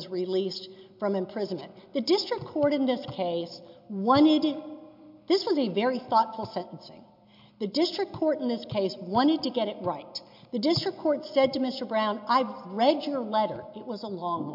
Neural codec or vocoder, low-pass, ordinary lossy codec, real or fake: none; 5.4 kHz; MP3, 48 kbps; real